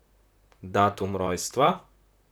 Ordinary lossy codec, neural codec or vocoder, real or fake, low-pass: none; vocoder, 44.1 kHz, 128 mel bands, Pupu-Vocoder; fake; none